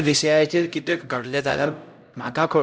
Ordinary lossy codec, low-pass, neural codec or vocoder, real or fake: none; none; codec, 16 kHz, 0.5 kbps, X-Codec, HuBERT features, trained on LibriSpeech; fake